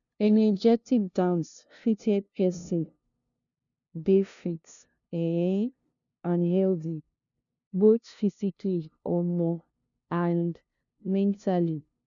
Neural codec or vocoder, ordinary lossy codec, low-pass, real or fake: codec, 16 kHz, 0.5 kbps, FunCodec, trained on LibriTTS, 25 frames a second; none; 7.2 kHz; fake